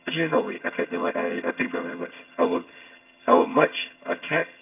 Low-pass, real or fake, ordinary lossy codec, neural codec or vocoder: 3.6 kHz; fake; none; vocoder, 22.05 kHz, 80 mel bands, HiFi-GAN